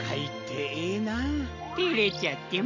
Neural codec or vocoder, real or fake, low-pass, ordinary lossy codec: none; real; 7.2 kHz; none